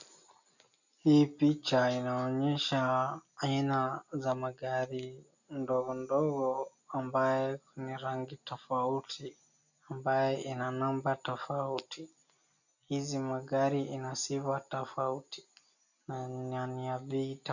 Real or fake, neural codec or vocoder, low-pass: real; none; 7.2 kHz